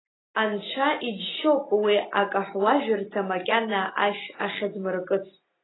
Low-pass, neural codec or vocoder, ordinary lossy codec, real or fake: 7.2 kHz; none; AAC, 16 kbps; real